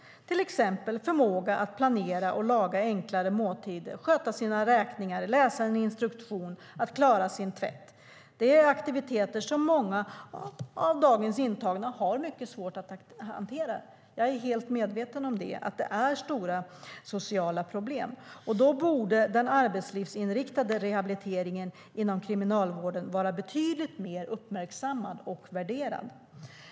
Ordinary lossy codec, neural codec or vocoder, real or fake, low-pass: none; none; real; none